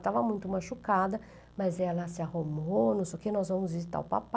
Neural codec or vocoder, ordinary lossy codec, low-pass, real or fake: none; none; none; real